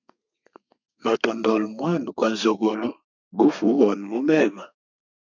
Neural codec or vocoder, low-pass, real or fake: codec, 32 kHz, 1.9 kbps, SNAC; 7.2 kHz; fake